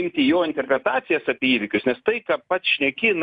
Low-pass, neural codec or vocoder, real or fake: 10.8 kHz; none; real